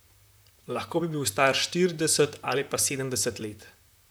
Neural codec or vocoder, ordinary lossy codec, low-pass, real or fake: vocoder, 44.1 kHz, 128 mel bands, Pupu-Vocoder; none; none; fake